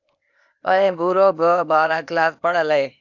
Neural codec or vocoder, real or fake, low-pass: codec, 16 kHz, 0.8 kbps, ZipCodec; fake; 7.2 kHz